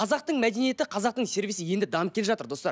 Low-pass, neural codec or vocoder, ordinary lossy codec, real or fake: none; none; none; real